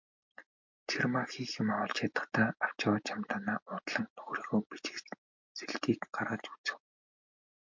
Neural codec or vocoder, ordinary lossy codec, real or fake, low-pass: none; MP3, 48 kbps; real; 7.2 kHz